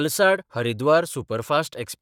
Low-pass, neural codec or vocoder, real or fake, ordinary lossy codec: 19.8 kHz; codec, 44.1 kHz, 7.8 kbps, Pupu-Codec; fake; none